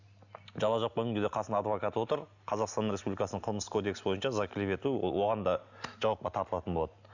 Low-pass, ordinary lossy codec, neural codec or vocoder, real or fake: 7.2 kHz; none; none; real